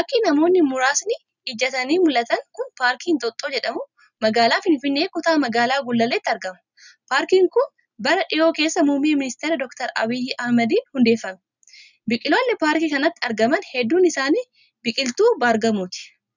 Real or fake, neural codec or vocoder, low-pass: real; none; 7.2 kHz